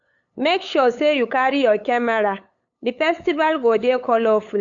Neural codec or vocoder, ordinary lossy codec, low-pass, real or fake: codec, 16 kHz, 8 kbps, FunCodec, trained on LibriTTS, 25 frames a second; AAC, 64 kbps; 7.2 kHz; fake